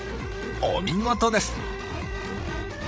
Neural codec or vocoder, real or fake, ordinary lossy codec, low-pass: codec, 16 kHz, 16 kbps, FreqCodec, larger model; fake; none; none